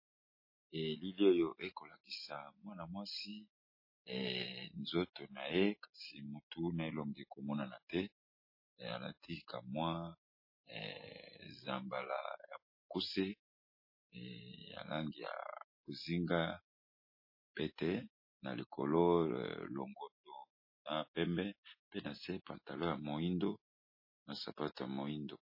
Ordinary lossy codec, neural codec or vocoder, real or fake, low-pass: MP3, 24 kbps; none; real; 5.4 kHz